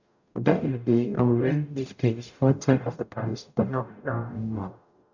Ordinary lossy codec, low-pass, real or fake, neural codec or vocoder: none; 7.2 kHz; fake; codec, 44.1 kHz, 0.9 kbps, DAC